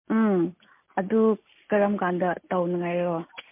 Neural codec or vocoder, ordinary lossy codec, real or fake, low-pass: none; MP3, 24 kbps; real; 3.6 kHz